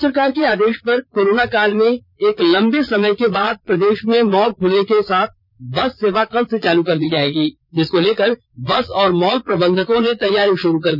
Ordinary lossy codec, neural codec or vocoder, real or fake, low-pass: none; vocoder, 22.05 kHz, 80 mel bands, Vocos; fake; 5.4 kHz